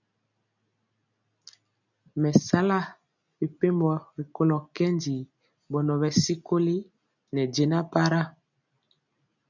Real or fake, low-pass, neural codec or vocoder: real; 7.2 kHz; none